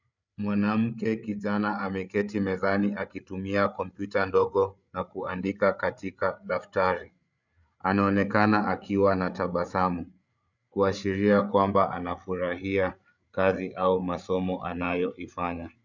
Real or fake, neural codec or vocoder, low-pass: fake; codec, 16 kHz, 8 kbps, FreqCodec, larger model; 7.2 kHz